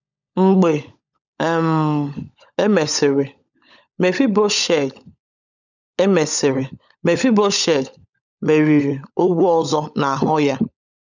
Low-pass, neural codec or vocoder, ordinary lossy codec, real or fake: 7.2 kHz; codec, 16 kHz, 16 kbps, FunCodec, trained on LibriTTS, 50 frames a second; none; fake